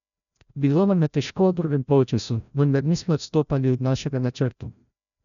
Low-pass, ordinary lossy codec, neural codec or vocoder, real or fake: 7.2 kHz; none; codec, 16 kHz, 0.5 kbps, FreqCodec, larger model; fake